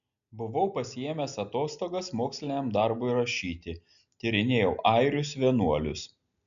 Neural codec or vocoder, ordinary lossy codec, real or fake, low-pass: none; MP3, 96 kbps; real; 7.2 kHz